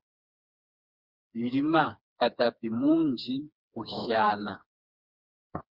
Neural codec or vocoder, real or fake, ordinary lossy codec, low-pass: codec, 16 kHz, 2 kbps, FreqCodec, smaller model; fake; Opus, 64 kbps; 5.4 kHz